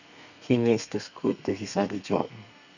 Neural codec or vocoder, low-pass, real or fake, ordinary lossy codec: codec, 32 kHz, 1.9 kbps, SNAC; 7.2 kHz; fake; none